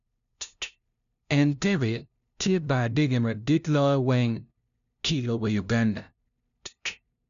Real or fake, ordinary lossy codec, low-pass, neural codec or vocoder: fake; none; 7.2 kHz; codec, 16 kHz, 0.5 kbps, FunCodec, trained on LibriTTS, 25 frames a second